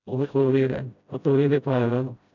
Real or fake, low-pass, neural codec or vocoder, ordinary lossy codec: fake; 7.2 kHz; codec, 16 kHz, 0.5 kbps, FreqCodec, smaller model; none